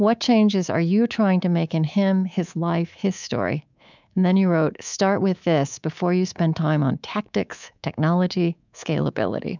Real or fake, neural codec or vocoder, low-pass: fake; codec, 24 kHz, 3.1 kbps, DualCodec; 7.2 kHz